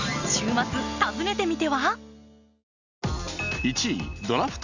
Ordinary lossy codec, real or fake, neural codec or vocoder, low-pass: none; real; none; 7.2 kHz